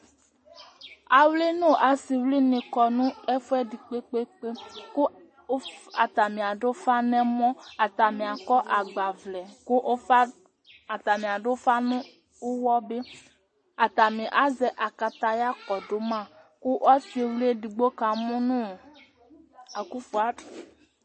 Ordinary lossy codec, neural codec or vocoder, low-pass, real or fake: MP3, 32 kbps; none; 9.9 kHz; real